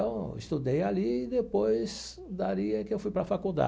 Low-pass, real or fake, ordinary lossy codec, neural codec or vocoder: none; real; none; none